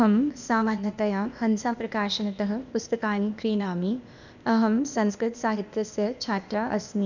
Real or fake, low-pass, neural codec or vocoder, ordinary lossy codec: fake; 7.2 kHz; codec, 16 kHz, 0.8 kbps, ZipCodec; none